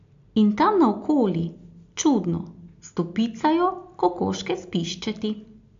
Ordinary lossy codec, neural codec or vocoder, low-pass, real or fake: AAC, 48 kbps; none; 7.2 kHz; real